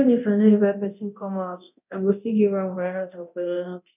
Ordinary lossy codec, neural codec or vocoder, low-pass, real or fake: none; codec, 24 kHz, 0.9 kbps, DualCodec; 3.6 kHz; fake